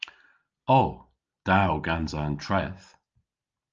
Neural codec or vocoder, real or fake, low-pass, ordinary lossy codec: none; real; 7.2 kHz; Opus, 24 kbps